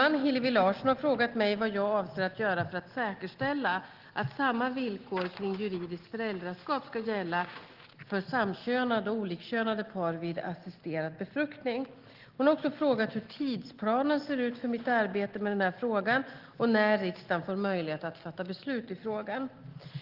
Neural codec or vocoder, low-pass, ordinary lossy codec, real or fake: none; 5.4 kHz; Opus, 16 kbps; real